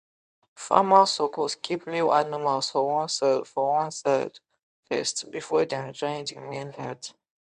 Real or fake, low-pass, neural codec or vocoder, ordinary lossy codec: fake; 10.8 kHz; codec, 24 kHz, 0.9 kbps, WavTokenizer, medium speech release version 1; none